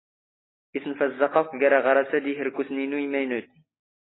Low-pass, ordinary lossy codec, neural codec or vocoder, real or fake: 7.2 kHz; AAC, 16 kbps; none; real